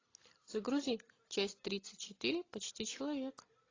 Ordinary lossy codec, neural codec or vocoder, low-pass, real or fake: AAC, 32 kbps; none; 7.2 kHz; real